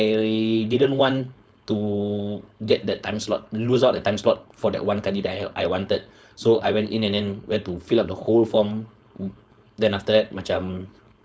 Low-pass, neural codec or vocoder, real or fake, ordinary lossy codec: none; codec, 16 kHz, 4.8 kbps, FACodec; fake; none